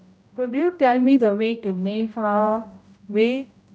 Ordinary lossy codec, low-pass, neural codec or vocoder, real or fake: none; none; codec, 16 kHz, 0.5 kbps, X-Codec, HuBERT features, trained on general audio; fake